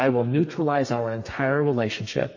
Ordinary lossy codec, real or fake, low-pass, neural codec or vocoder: MP3, 32 kbps; fake; 7.2 kHz; codec, 32 kHz, 1.9 kbps, SNAC